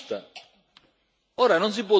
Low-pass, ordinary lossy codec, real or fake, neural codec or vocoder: none; none; real; none